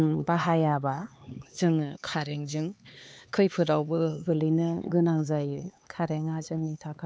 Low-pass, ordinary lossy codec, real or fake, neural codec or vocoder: none; none; fake; codec, 16 kHz, 4 kbps, X-Codec, HuBERT features, trained on LibriSpeech